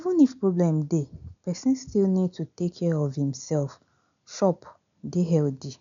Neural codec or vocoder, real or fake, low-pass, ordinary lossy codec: none; real; 7.2 kHz; none